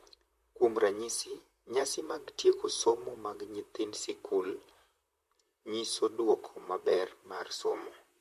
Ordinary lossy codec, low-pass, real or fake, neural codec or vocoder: MP3, 64 kbps; 14.4 kHz; fake; vocoder, 44.1 kHz, 128 mel bands, Pupu-Vocoder